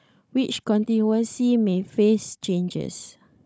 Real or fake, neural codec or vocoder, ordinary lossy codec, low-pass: fake; codec, 16 kHz, 16 kbps, FunCodec, trained on Chinese and English, 50 frames a second; none; none